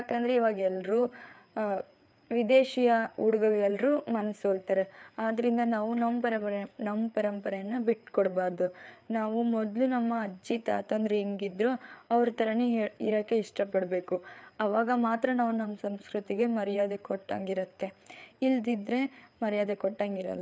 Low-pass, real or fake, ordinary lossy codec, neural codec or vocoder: none; fake; none; codec, 16 kHz, 4 kbps, FreqCodec, larger model